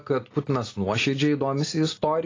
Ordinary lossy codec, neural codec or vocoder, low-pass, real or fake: AAC, 32 kbps; none; 7.2 kHz; real